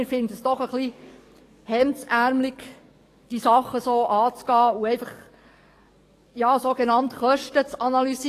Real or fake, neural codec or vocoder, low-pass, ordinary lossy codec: fake; codec, 44.1 kHz, 7.8 kbps, DAC; 14.4 kHz; AAC, 48 kbps